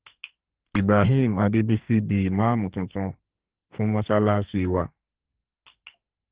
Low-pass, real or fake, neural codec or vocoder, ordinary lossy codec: 3.6 kHz; fake; codec, 44.1 kHz, 2.6 kbps, SNAC; Opus, 16 kbps